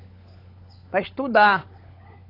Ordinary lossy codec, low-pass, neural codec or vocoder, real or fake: AAC, 24 kbps; 5.4 kHz; codec, 16 kHz, 16 kbps, FunCodec, trained on LibriTTS, 50 frames a second; fake